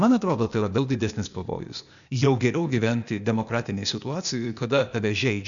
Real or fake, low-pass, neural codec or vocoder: fake; 7.2 kHz; codec, 16 kHz, 0.8 kbps, ZipCodec